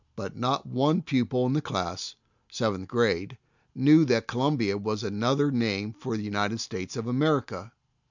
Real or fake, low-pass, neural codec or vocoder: real; 7.2 kHz; none